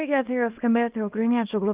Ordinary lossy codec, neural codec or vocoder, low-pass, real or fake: Opus, 24 kbps; codec, 24 kHz, 0.9 kbps, WavTokenizer, small release; 3.6 kHz; fake